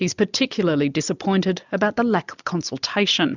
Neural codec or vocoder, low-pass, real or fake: none; 7.2 kHz; real